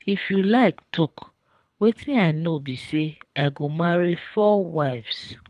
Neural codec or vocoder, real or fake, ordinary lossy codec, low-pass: codec, 24 kHz, 3 kbps, HILCodec; fake; none; none